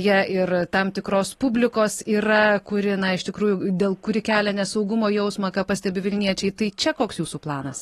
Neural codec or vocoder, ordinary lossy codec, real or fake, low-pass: none; AAC, 32 kbps; real; 19.8 kHz